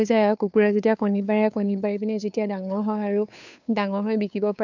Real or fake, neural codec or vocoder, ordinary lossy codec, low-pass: fake; codec, 16 kHz, 2 kbps, FunCodec, trained on Chinese and English, 25 frames a second; none; 7.2 kHz